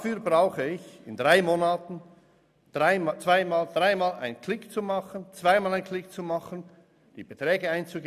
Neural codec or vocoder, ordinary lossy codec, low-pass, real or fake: none; none; 14.4 kHz; real